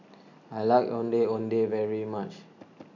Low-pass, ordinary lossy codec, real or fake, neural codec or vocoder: 7.2 kHz; none; real; none